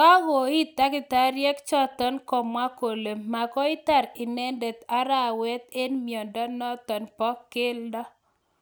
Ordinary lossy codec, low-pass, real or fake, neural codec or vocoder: none; none; real; none